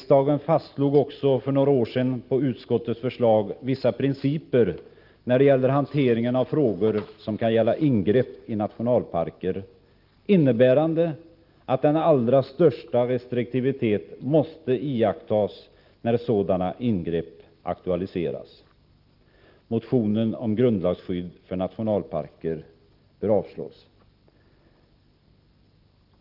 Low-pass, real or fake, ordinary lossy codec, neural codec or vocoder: 5.4 kHz; real; Opus, 32 kbps; none